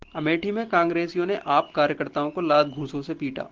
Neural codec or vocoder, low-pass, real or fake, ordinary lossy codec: none; 7.2 kHz; real; Opus, 16 kbps